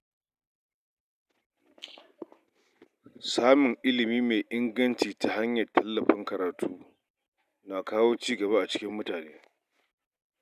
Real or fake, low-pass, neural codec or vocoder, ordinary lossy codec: real; 14.4 kHz; none; none